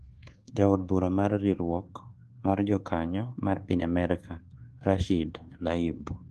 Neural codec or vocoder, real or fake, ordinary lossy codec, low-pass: codec, 24 kHz, 1.2 kbps, DualCodec; fake; Opus, 16 kbps; 10.8 kHz